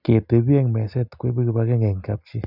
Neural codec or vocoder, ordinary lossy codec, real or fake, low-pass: none; none; real; 5.4 kHz